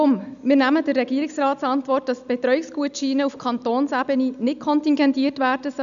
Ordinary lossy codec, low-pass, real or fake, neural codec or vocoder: none; 7.2 kHz; real; none